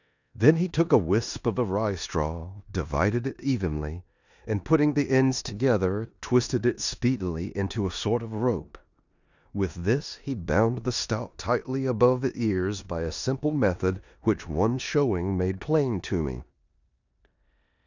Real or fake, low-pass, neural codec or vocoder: fake; 7.2 kHz; codec, 16 kHz in and 24 kHz out, 0.9 kbps, LongCat-Audio-Codec, four codebook decoder